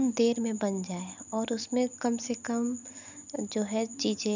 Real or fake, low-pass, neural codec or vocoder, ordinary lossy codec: real; 7.2 kHz; none; none